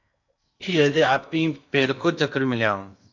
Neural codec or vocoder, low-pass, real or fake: codec, 16 kHz in and 24 kHz out, 0.8 kbps, FocalCodec, streaming, 65536 codes; 7.2 kHz; fake